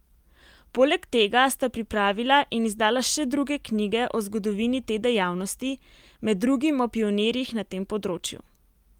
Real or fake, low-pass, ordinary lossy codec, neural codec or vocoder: real; 19.8 kHz; Opus, 32 kbps; none